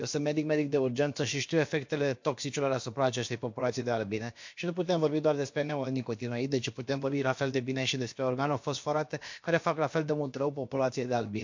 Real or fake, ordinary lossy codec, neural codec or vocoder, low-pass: fake; MP3, 48 kbps; codec, 16 kHz, about 1 kbps, DyCAST, with the encoder's durations; 7.2 kHz